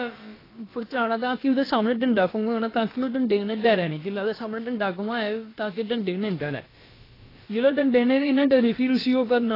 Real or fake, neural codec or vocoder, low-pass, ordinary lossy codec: fake; codec, 16 kHz, about 1 kbps, DyCAST, with the encoder's durations; 5.4 kHz; AAC, 24 kbps